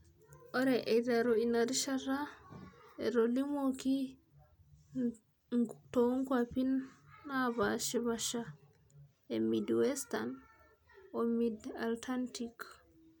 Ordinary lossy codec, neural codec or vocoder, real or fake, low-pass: none; none; real; none